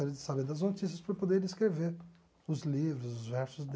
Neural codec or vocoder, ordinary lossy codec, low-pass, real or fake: none; none; none; real